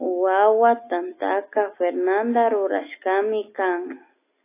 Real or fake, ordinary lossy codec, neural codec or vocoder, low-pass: real; AAC, 24 kbps; none; 3.6 kHz